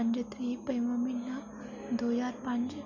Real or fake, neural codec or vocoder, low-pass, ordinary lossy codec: real; none; 7.2 kHz; none